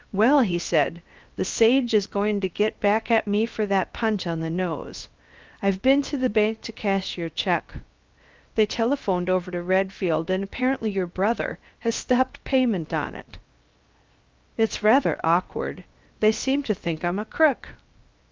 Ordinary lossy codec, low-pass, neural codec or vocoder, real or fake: Opus, 24 kbps; 7.2 kHz; codec, 16 kHz, 0.7 kbps, FocalCodec; fake